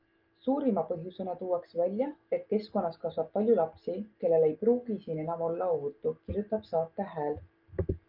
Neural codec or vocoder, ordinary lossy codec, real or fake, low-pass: none; Opus, 24 kbps; real; 5.4 kHz